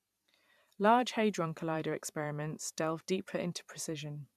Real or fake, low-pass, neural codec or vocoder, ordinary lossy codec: fake; 14.4 kHz; vocoder, 44.1 kHz, 128 mel bands every 512 samples, BigVGAN v2; none